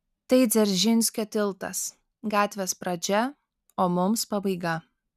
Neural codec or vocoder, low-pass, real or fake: none; 14.4 kHz; real